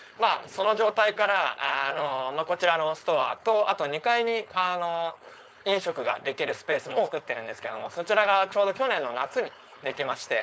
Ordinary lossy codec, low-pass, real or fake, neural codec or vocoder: none; none; fake; codec, 16 kHz, 4.8 kbps, FACodec